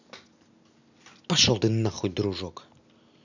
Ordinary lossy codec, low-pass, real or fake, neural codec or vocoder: none; 7.2 kHz; real; none